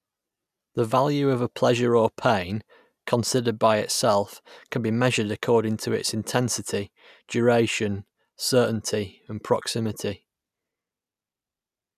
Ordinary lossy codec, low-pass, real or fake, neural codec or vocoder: none; 14.4 kHz; real; none